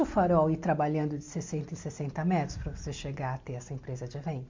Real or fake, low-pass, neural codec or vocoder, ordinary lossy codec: real; 7.2 kHz; none; MP3, 64 kbps